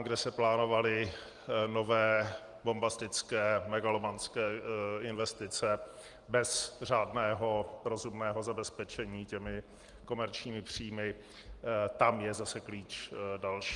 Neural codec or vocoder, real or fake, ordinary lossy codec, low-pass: none; real; Opus, 24 kbps; 10.8 kHz